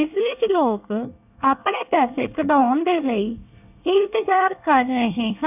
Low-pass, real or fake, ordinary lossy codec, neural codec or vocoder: 3.6 kHz; fake; none; codec, 24 kHz, 1 kbps, SNAC